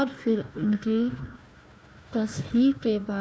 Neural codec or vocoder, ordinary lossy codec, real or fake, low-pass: codec, 16 kHz, 1 kbps, FunCodec, trained on Chinese and English, 50 frames a second; none; fake; none